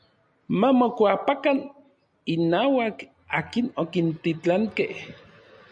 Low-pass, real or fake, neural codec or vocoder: 9.9 kHz; real; none